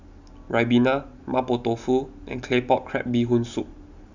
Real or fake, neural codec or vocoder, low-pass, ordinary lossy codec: real; none; 7.2 kHz; none